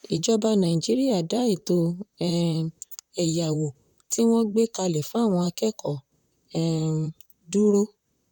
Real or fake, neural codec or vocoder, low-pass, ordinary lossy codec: fake; vocoder, 44.1 kHz, 128 mel bands, Pupu-Vocoder; 19.8 kHz; none